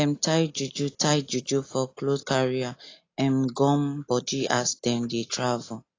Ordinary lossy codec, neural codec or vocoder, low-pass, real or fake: AAC, 32 kbps; none; 7.2 kHz; real